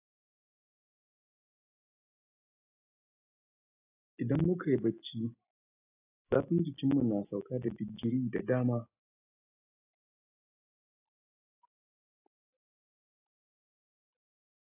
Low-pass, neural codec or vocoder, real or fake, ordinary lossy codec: 3.6 kHz; none; real; AAC, 32 kbps